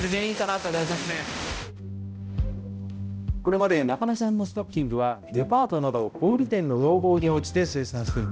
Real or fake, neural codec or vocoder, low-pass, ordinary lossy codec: fake; codec, 16 kHz, 0.5 kbps, X-Codec, HuBERT features, trained on balanced general audio; none; none